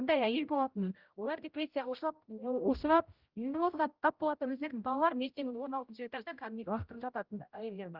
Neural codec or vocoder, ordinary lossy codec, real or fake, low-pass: codec, 16 kHz, 0.5 kbps, X-Codec, HuBERT features, trained on general audio; Opus, 32 kbps; fake; 5.4 kHz